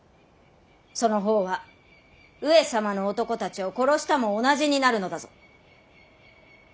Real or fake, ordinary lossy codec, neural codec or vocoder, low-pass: real; none; none; none